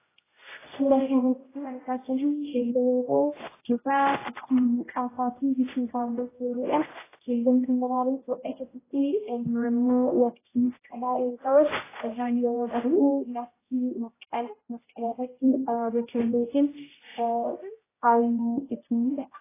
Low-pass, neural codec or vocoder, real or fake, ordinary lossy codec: 3.6 kHz; codec, 16 kHz, 0.5 kbps, X-Codec, HuBERT features, trained on general audio; fake; AAC, 16 kbps